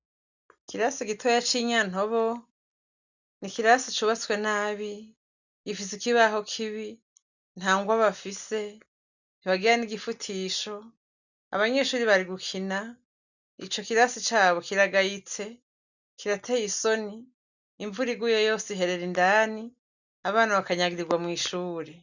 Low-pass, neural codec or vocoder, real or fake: 7.2 kHz; none; real